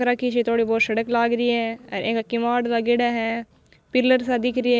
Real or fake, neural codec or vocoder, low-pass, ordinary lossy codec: real; none; none; none